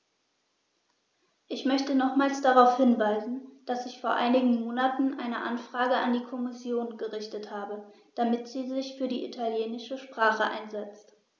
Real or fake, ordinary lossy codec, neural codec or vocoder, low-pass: real; none; none; none